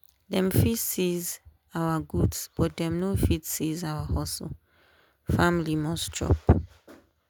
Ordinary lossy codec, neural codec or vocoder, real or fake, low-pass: none; none; real; none